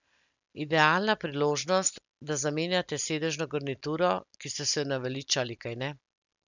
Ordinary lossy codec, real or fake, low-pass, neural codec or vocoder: none; real; 7.2 kHz; none